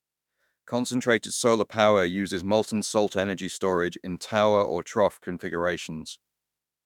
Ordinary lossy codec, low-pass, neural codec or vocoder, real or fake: none; 19.8 kHz; autoencoder, 48 kHz, 32 numbers a frame, DAC-VAE, trained on Japanese speech; fake